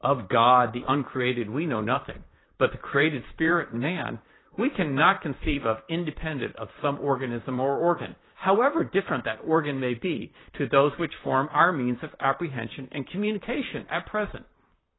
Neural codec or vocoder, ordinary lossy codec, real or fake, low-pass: vocoder, 44.1 kHz, 128 mel bands, Pupu-Vocoder; AAC, 16 kbps; fake; 7.2 kHz